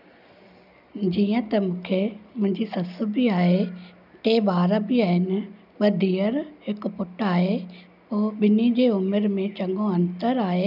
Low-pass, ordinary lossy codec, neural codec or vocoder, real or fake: 5.4 kHz; none; none; real